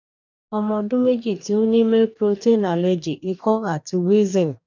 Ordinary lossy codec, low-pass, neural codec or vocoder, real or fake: none; 7.2 kHz; codec, 44.1 kHz, 2.6 kbps, DAC; fake